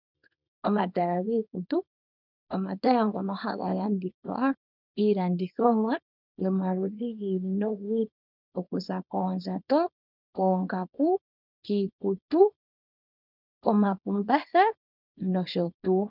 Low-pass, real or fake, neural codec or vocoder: 5.4 kHz; fake; codec, 24 kHz, 0.9 kbps, WavTokenizer, small release